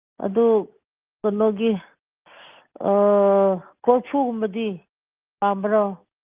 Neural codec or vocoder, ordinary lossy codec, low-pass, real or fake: none; Opus, 24 kbps; 3.6 kHz; real